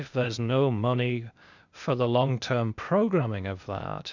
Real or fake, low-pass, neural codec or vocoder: fake; 7.2 kHz; codec, 16 kHz, 0.8 kbps, ZipCodec